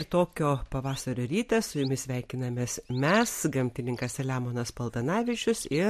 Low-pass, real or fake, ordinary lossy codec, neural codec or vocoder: 14.4 kHz; real; MP3, 64 kbps; none